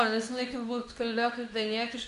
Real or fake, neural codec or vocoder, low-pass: fake; codec, 24 kHz, 0.9 kbps, WavTokenizer, medium speech release version 2; 10.8 kHz